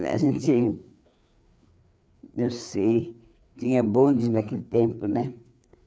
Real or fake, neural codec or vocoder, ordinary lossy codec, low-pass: fake; codec, 16 kHz, 4 kbps, FreqCodec, larger model; none; none